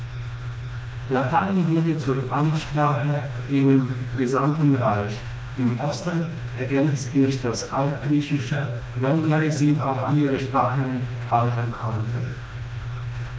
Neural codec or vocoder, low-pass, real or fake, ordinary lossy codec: codec, 16 kHz, 1 kbps, FreqCodec, smaller model; none; fake; none